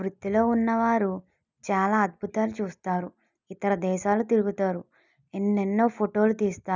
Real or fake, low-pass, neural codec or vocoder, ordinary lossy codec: real; 7.2 kHz; none; none